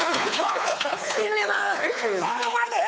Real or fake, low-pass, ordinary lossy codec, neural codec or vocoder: fake; none; none; codec, 16 kHz, 4 kbps, X-Codec, WavLM features, trained on Multilingual LibriSpeech